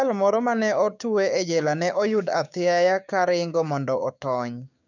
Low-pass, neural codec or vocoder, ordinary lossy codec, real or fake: 7.2 kHz; codec, 16 kHz, 6 kbps, DAC; none; fake